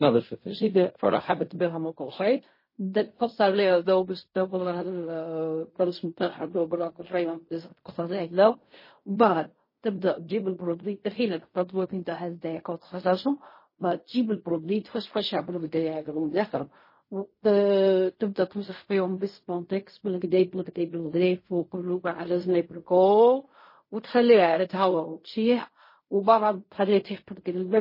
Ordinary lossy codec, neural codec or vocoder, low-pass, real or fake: MP3, 24 kbps; codec, 16 kHz in and 24 kHz out, 0.4 kbps, LongCat-Audio-Codec, fine tuned four codebook decoder; 5.4 kHz; fake